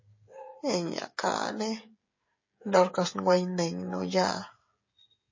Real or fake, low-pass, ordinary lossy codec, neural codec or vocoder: fake; 7.2 kHz; MP3, 32 kbps; codec, 16 kHz, 16 kbps, FreqCodec, smaller model